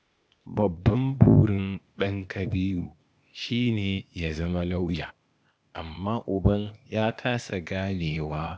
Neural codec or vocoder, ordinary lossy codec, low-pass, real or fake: codec, 16 kHz, 0.8 kbps, ZipCodec; none; none; fake